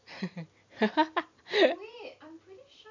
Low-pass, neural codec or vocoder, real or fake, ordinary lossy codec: 7.2 kHz; none; real; MP3, 64 kbps